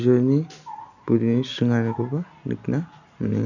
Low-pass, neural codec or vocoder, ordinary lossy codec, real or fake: 7.2 kHz; none; none; real